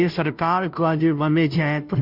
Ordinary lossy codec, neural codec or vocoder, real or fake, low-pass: none; codec, 16 kHz, 0.5 kbps, FunCodec, trained on Chinese and English, 25 frames a second; fake; 5.4 kHz